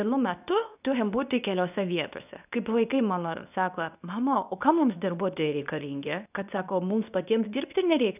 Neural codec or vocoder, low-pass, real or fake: codec, 24 kHz, 0.9 kbps, WavTokenizer, medium speech release version 2; 3.6 kHz; fake